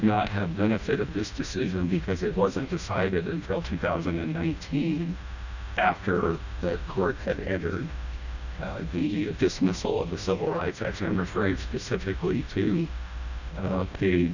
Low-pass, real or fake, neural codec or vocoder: 7.2 kHz; fake; codec, 16 kHz, 1 kbps, FreqCodec, smaller model